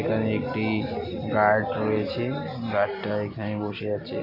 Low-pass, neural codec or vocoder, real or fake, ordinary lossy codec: 5.4 kHz; none; real; Opus, 64 kbps